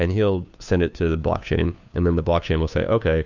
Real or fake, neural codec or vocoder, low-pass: fake; codec, 16 kHz, 2 kbps, FunCodec, trained on Chinese and English, 25 frames a second; 7.2 kHz